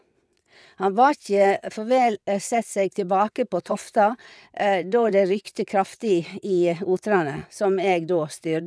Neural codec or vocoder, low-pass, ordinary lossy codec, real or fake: vocoder, 22.05 kHz, 80 mel bands, WaveNeXt; none; none; fake